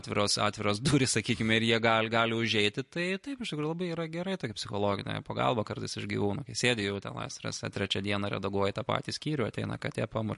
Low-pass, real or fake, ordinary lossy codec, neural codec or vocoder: 14.4 kHz; real; MP3, 48 kbps; none